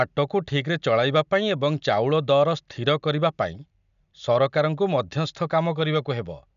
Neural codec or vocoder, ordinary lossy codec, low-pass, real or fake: none; none; 7.2 kHz; real